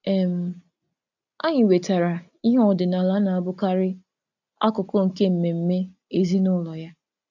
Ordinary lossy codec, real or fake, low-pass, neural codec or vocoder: none; real; 7.2 kHz; none